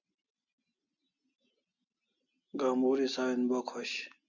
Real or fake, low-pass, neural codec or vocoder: real; 7.2 kHz; none